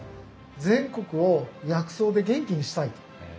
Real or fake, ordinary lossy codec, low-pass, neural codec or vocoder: real; none; none; none